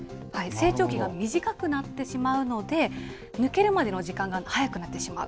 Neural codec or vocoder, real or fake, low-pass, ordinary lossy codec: none; real; none; none